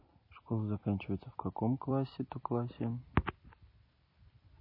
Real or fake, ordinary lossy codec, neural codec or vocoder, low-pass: real; MP3, 24 kbps; none; 5.4 kHz